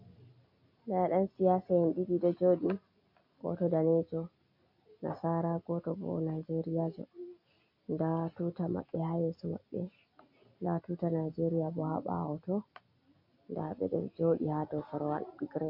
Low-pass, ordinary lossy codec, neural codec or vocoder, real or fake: 5.4 kHz; MP3, 32 kbps; none; real